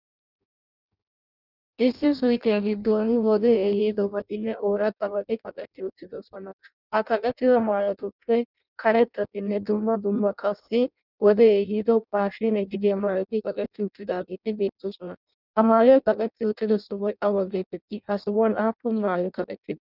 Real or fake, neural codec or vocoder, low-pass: fake; codec, 16 kHz in and 24 kHz out, 0.6 kbps, FireRedTTS-2 codec; 5.4 kHz